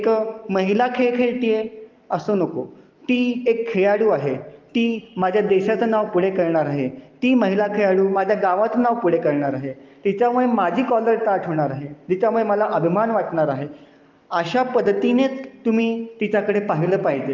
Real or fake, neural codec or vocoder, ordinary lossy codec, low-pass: real; none; Opus, 24 kbps; 7.2 kHz